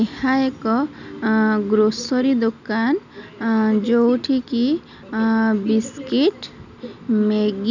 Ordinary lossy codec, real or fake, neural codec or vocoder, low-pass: none; real; none; 7.2 kHz